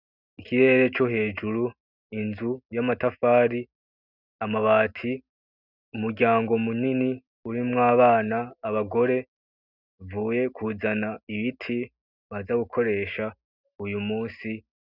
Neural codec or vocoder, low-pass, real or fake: none; 5.4 kHz; real